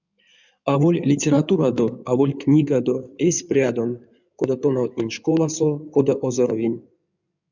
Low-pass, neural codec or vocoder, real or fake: 7.2 kHz; codec, 16 kHz in and 24 kHz out, 2.2 kbps, FireRedTTS-2 codec; fake